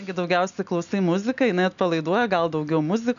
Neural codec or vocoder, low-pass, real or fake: none; 7.2 kHz; real